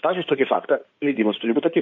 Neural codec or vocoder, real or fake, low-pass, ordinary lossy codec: codec, 16 kHz in and 24 kHz out, 2.2 kbps, FireRedTTS-2 codec; fake; 7.2 kHz; MP3, 48 kbps